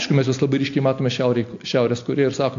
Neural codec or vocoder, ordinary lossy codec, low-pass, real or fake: none; AAC, 48 kbps; 7.2 kHz; real